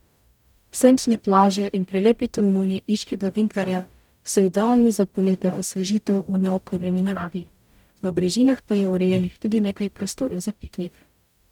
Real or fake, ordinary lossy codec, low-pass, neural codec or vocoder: fake; none; 19.8 kHz; codec, 44.1 kHz, 0.9 kbps, DAC